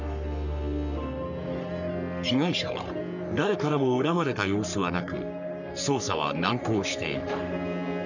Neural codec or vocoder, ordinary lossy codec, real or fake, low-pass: codec, 44.1 kHz, 3.4 kbps, Pupu-Codec; none; fake; 7.2 kHz